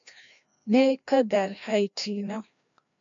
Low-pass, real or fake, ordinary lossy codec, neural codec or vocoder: 7.2 kHz; fake; MP3, 64 kbps; codec, 16 kHz, 1 kbps, FreqCodec, larger model